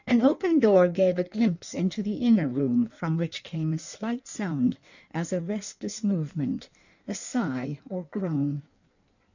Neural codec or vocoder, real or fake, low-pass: codec, 16 kHz in and 24 kHz out, 1.1 kbps, FireRedTTS-2 codec; fake; 7.2 kHz